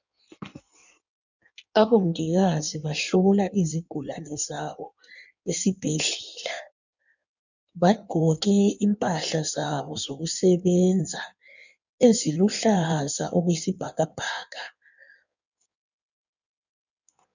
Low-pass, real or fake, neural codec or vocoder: 7.2 kHz; fake; codec, 16 kHz in and 24 kHz out, 1.1 kbps, FireRedTTS-2 codec